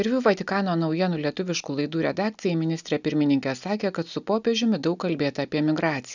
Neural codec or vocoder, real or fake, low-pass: none; real; 7.2 kHz